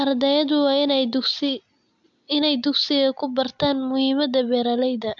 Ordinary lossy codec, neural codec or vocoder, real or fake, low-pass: AAC, 64 kbps; none; real; 7.2 kHz